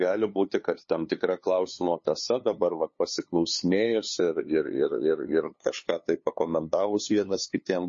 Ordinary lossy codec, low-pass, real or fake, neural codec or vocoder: MP3, 32 kbps; 7.2 kHz; fake; codec, 16 kHz, 2 kbps, FunCodec, trained on LibriTTS, 25 frames a second